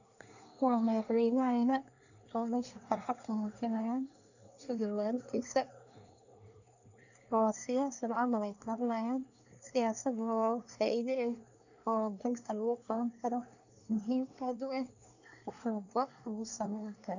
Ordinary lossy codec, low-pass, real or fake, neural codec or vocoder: none; 7.2 kHz; fake; codec, 24 kHz, 1 kbps, SNAC